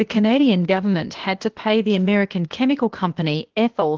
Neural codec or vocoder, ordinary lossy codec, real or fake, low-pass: codec, 16 kHz, 0.8 kbps, ZipCodec; Opus, 16 kbps; fake; 7.2 kHz